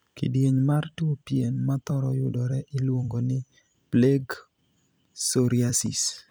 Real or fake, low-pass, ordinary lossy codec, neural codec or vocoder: fake; none; none; vocoder, 44.1 kHz, 128 mel bands every 256 samples, BigVGAN v2